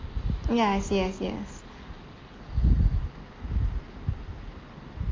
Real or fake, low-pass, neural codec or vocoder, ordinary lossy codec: real; 7.2 kHz; none; Opus, 32 kbps